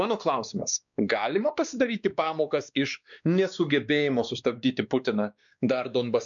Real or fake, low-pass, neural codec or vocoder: fake; 7.2 kHz; codec, 16 kHz, 2 kbps, X-Codec, WavLM features, trained on Multilingual LibriSpeech